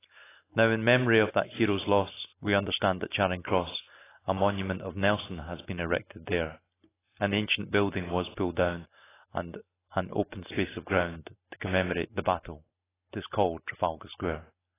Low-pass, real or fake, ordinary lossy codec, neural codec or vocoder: 3.6 kHz; real; AAC, 16 kbps; none